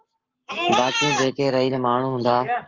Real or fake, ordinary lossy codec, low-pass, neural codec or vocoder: fake; Opus, 32 kbps; 7.2 kHz; codec, 44.1 kHz, 7.8 kbps, Pupu-Codec